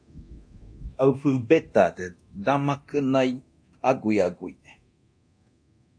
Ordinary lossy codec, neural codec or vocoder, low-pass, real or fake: AAC, 64 kbps; codec, 24 kHz, 0.9 kbps, DualCodec; 9.9 kHz; fake